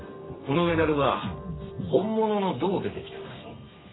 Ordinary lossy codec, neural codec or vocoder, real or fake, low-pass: AAC, 16 kbps; codec, 32 kHz, 1.9 kbps, SNAC; fake; 7.2 kHz